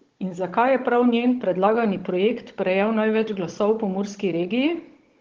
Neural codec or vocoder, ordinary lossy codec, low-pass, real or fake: codec, 16 kHz, 16 kbps, FunCodec, trained on Chinese and English, 50 frames a second; Opus, 16 kbps; 7.2 kHz; fake